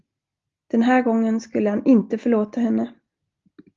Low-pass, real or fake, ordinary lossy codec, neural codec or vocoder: 7.2 kHz; real; Opus, 32 kbps; none